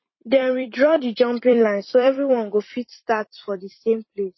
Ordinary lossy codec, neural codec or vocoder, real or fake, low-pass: MP3, 24 kbps; vocoder, 44.1 kHz, 128 mel bands every 256 samples, BigVGAN v2; fake; 7.2 kHz